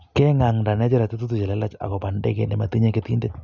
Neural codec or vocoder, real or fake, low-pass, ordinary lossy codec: none; real; 7.2 kHz; none